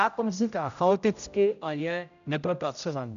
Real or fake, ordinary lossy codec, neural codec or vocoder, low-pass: fake; AAC, 96 kbps; codec, 16 kHz, 0.5 kbps, X-Codec, HuBERT features, trained on general audio; 7.2 kHz